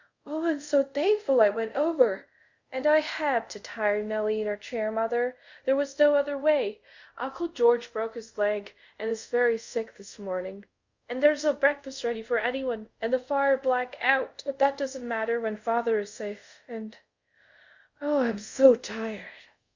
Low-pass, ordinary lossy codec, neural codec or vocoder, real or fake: 7.2 kHz; Opus, 64 kbps; codec, 24 kHz, 0.5 kbps, DualCodec; fake